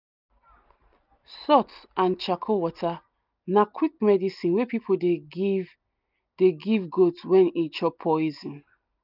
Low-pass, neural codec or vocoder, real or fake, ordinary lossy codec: 5.4 kHz; none; real; none